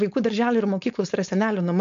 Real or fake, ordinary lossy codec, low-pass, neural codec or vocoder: fake; AAC, 64 kbps; 7.2 kHz; codec, 16 kHz, 4.8 kbps, FACodec